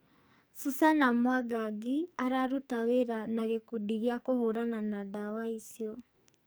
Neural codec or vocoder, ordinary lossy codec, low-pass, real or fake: codec, 44.1 kHz, 2.6 kbps, SNAC; none; none; fake